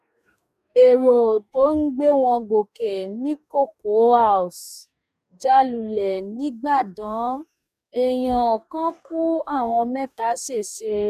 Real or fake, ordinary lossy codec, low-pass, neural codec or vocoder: fake; none; 14.4 kHz; codec, 44.1 kHz, 2.6 kbps, DAC